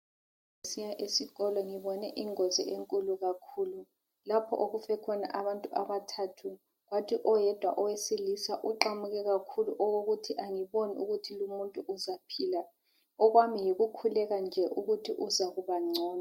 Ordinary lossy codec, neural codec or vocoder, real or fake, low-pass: MP3, 64 kbps; none; real; 19.8 kHz